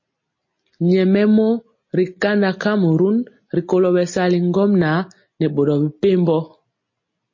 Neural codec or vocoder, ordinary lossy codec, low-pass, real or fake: none; MP3, 32 kbps; 7.2 kHz; real